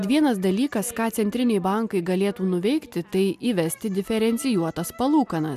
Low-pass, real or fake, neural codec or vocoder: 14.4 kHz; real; none